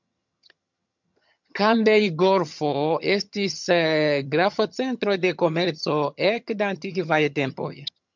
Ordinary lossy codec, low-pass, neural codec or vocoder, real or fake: MP3, 64 kbps; 7.2 kHz; vocoder, 22.05 kHz, 80 mel bands, HiFi-GAN; fake